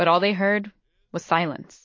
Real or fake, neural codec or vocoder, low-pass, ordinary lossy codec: real; none; 7.2 kHz; MP3, 32 kbps